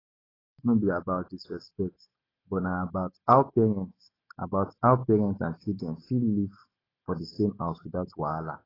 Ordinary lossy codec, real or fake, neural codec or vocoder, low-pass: AAC, 24 kbps; real; none; 5.4 kHz